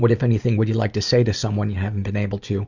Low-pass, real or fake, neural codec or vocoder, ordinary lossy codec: 7.2 kHz; real; none; Opus, 64 kbps